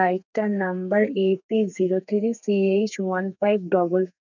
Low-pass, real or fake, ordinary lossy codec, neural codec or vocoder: 7.2 kHz; fake; none; codec, 32 kHz, 1.9 kbps, SNAC